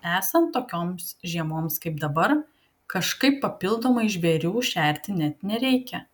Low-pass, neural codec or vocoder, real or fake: 19.8 kHz; none; real